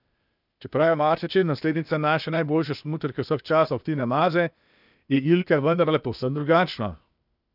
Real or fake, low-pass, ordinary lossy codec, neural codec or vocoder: fake; 5.4 kHz; none; codec, 16 kHz, 0.8 kbps, ZipCodec